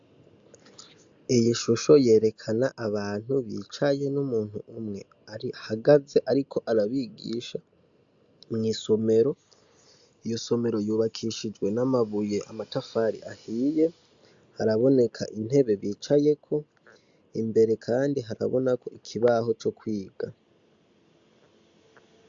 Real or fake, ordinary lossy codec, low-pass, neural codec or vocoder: real; AAC, 64 kbps; 7.2 kHz; none